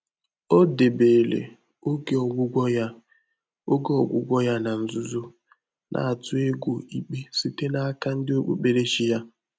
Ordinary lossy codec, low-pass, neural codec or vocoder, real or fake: none; none; none; real